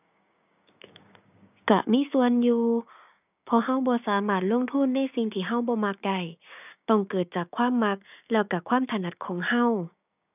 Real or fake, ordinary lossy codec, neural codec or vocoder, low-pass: fake; none; codec, 16 kHz, 6 kbps, DAC; 3.6 kHz